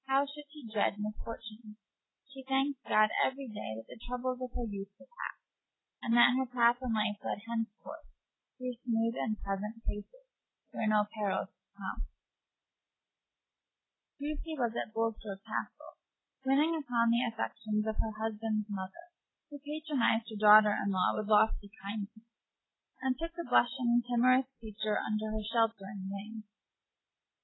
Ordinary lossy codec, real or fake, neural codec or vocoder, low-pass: AAC, 16 kbps; real; none; 7.2 kHz